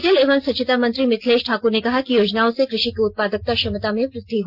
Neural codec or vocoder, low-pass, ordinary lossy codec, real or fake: none; 5.4 kHz; Opus, 32 kbps; real